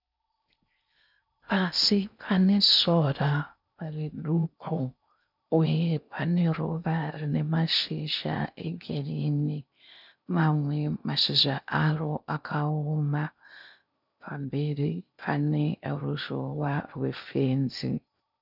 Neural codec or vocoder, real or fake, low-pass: codec, 16 kHz in and 24 kHz out, 0.6 kbps, FocalCodec, streaming, 4096 codes; fake; 5.4 kHz